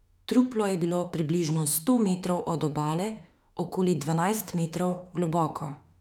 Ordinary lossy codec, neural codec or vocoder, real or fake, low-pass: none; autoencoder, 48 kHz, 32 numbers a frame, DAC-VAE, trained on Japanese speech; fake; 19.8 kHz